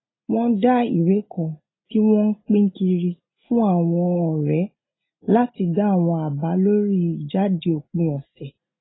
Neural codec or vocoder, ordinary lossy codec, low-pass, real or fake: none; AAC, 16 kbps; 7.2 kHz; real